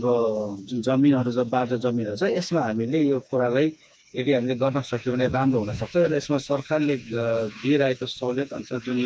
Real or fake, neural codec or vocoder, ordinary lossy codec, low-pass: fake; codec, 16 kHz, 2 kbps, FreqCodec, smaller model; none; none